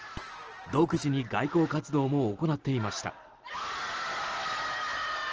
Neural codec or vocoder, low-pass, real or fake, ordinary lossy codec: none; 7.2 kHz; real; Opus, 16 kbps